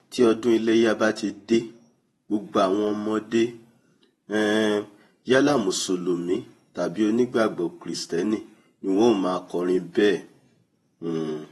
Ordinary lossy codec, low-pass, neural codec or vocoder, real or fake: AAC, 32 kbps; 19.8 kHz; vocoder, 48 kHz, 128 mel bands, Vocos; fake